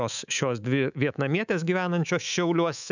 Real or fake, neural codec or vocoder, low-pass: fake; codec, 24 kHz, 3.1 kbps, DualCodec; 7.2 kHz